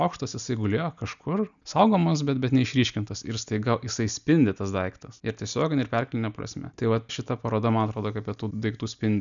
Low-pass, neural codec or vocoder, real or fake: 7.2 kHz; none; real